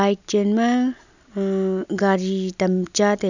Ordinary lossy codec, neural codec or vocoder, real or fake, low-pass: none; none; real; 7.2 kHz